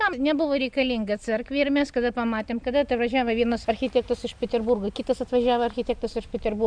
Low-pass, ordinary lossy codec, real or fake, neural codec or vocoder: 9.9 kHz; MP3, 96 kbps; real; none